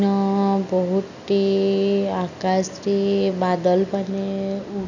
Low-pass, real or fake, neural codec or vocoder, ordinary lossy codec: 7.2 kHz; real; none; none